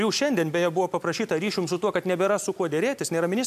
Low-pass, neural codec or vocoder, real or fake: 14.4 kHz; none; real